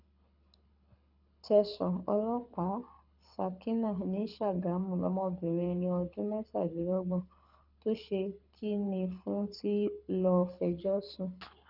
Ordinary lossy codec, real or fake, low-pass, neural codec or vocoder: none; fake; 5.4 kHz; codec, 24 kHz, 6 kbps, HILCodec